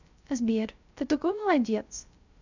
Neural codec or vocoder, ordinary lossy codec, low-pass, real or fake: codec, 16 kHz, 0.3 kbps, FocalCodec; none; 7.2 kHz; fake